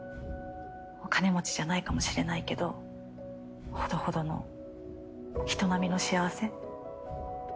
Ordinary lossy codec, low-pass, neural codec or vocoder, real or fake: none; none; none; real